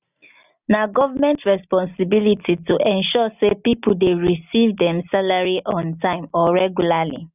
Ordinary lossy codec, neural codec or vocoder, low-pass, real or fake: none; none; 3.6 kHz; real